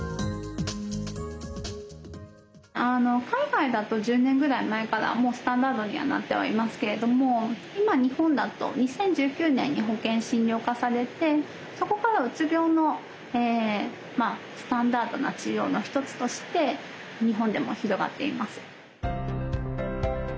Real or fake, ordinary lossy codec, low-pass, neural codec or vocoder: real; none; none; none